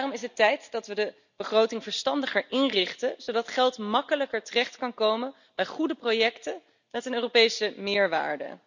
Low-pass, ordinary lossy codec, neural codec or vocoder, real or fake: 7.2 kHz; none; none; real